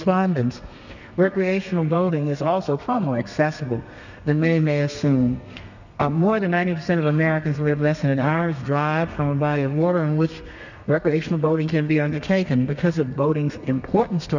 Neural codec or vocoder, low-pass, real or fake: codec, 32 kHz, 1.9 kbps, SNAC; 7.2 kHz; fake